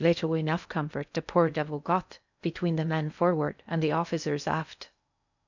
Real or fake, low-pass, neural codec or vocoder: fake; 7.2 kHz; codec, 16 kHz in and 24 kHz out, 0.6 kbps, FocalCodec, streaming, 2048 codes